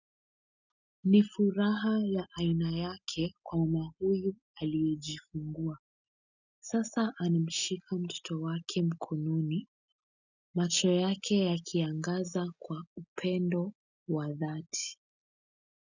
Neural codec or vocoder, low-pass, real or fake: none; 7.2 kHz; real